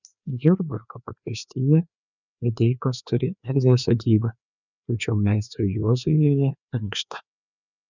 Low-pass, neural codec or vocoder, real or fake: 7.2 kHz; codec, 16 kHz, 2 kbps, FreqCodec, larger model; fake